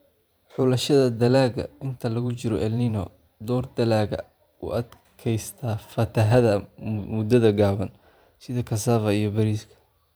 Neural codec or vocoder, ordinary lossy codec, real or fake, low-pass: vocoder, 44.1 kHz, 128 mel bands every 512 samples, BigVGAN v2; none; fake; none